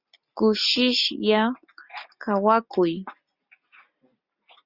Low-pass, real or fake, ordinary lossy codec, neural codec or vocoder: 5.4 kHz; real; Opus, 64 kbps; none